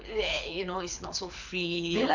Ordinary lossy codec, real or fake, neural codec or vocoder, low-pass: none; fake; codec, 24 kHz, 6 kbps, HILCodec; 7.2 kHz